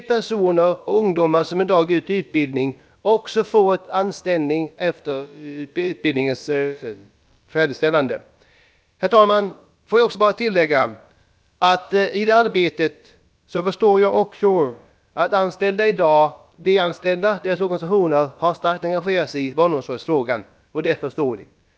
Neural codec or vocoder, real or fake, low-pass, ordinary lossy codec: codec, 16 kHz, about 1 kbps, DyCAST, with the encoder's durations; fake; none; none